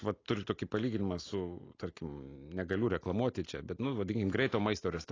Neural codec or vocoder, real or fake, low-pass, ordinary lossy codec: none; real; 7.2 kHz; AAC, 32 kbps